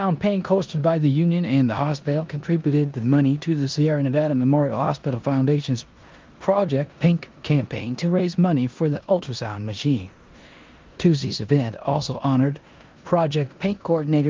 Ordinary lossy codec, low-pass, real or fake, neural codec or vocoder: Opus, 32 kbps; 7.2 kHz; fake; codec, 16 kHz in and 24 kHz out, 0.9 kbps, LongCat-Audio-Codec, four codebook decoder